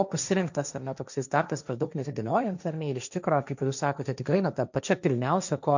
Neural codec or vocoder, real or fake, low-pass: codec, 16 kHz, 1.1 kbps, Voila-Tokenizer; fake; 7.2 kHz